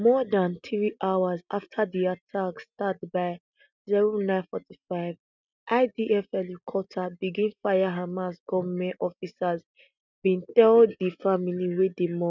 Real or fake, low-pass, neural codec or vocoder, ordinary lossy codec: real; 7.2 kHz; none; none